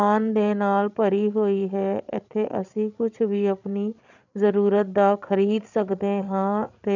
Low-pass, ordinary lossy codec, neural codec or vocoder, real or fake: 7.2 kHz; none; codec, 16 kHz, 16 kbps, FreqCodec, larger model; fake